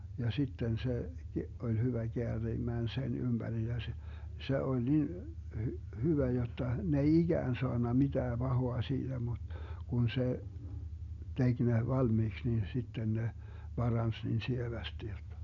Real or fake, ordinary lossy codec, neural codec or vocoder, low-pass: real; none; none; 7.2 kHz